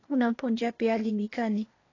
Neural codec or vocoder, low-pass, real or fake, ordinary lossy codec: codec, 16 kHz, 0.8 kbps, ZipCodec; 7.2 kHz; fake; AAC, 32 kbps